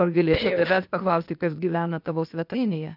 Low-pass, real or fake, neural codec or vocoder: 5.4 kHz; fake; codec, 16 kHz, 0.8 kbps, ZipCodec